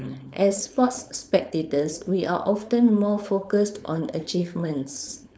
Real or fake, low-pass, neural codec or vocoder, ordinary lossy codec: fake; none; codec, 16 kHz, 4.8 kbps, FACodec; none